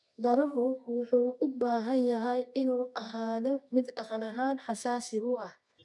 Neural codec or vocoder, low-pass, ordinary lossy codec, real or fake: codec, 24 kHz, 0.9 kbps, WavTokenizer, medium music audio release; 10.8 kHz; none; fake